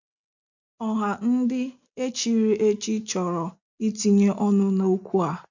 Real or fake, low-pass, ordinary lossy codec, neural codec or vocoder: real; 7.2 kHz; none; none